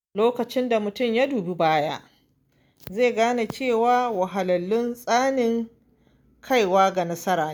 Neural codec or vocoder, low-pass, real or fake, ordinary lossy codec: none; none; real; none